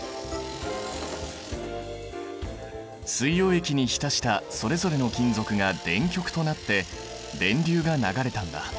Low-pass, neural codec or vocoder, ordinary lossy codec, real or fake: none; none; none; real